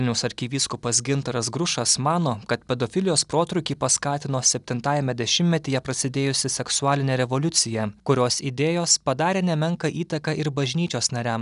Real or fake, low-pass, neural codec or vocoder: real; 10.8 kHz; none